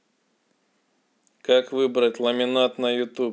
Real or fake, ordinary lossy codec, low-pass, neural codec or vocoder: real; none; none; none